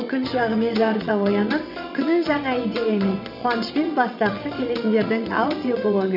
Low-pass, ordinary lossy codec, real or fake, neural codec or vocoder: 5.4 kHz; none; fake; vocoder, 22.05 kHz, 80 mel bands, WaveNeXt